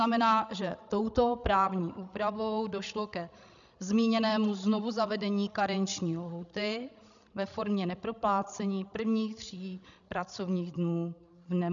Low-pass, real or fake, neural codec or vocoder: 7.2 kHz; fake; codec, 16 kHz, 16 kbps, FreqCodec, larger model